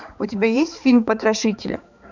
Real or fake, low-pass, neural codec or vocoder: fake; 7.2 kHz; codec, 16 kHz, 4 kbps, X-Codec, HuBERT features, trained on balanced general audio